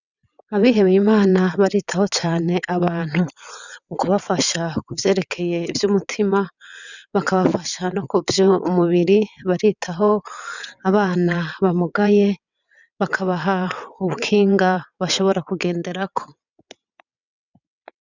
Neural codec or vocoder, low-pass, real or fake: vocoder, 22.05 kHz, 80 mel bands, WaveNeXt; 7.2 kHz; fake